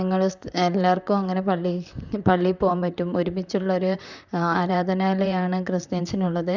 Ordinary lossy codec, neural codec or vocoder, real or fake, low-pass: none; vocoder, 44.1 kHz, 128 mel bands, Pupu-Vocoder; fake; 7.2 kHz